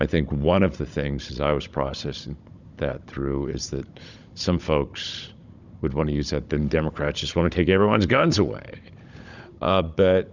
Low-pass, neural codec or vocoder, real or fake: 7.2 kHz; codec, 16 kHz, 16 kbps, FunCodec, trained on Chinese and English, 50 frames a second; fake